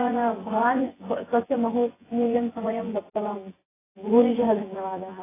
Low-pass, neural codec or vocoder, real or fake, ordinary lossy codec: 3.6 kHz; vocoder, 24 kHz, 100 mel bands, Vocos; fake; AAC, 16 kbps